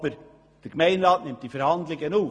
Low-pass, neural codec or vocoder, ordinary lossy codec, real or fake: none; none; none; real